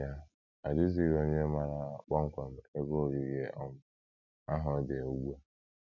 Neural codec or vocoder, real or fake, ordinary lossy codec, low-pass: none; real; none; 7.2 kHz